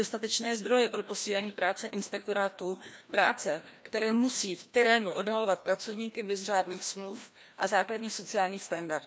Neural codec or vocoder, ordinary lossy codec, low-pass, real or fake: codec, 16 kHz, 1 kbps, FreqCodec, larger model; none; none; fake